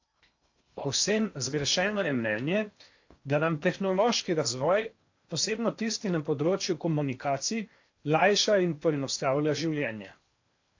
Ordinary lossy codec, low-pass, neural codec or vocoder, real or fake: AAC, 48 kbps; 7.2 kHz; codec, 16 kHz in and 24 kHz out, 0.8 kbps, FocalCodec, streaming, 65536 codes; fake